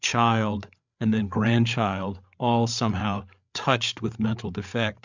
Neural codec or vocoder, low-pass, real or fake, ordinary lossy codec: codec, 16 kHz, 8 kbps, FreqCodec, larger model; 7.2 kHz; fake; MP3, 64 kbps